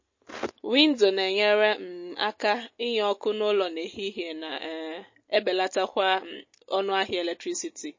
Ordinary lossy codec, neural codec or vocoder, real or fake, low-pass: MP3, 32 kbps; none; real; 7.2 kHz